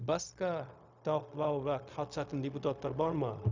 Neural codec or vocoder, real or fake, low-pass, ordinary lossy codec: codec, 16 kHz, 0.4 kbps, LongCat-Audio-Codec; fake; 7.2 kHz; Opus, 64 kbps